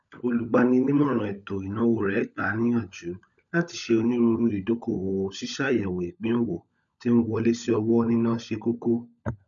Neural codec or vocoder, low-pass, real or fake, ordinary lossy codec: codec, 16 kHz, 16 kbps, FunCodec, trained on LibriTTS, 50 frames a second; 7.2 kHz; fake; none